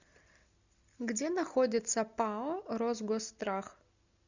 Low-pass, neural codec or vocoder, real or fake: 7.2 kHz; none; real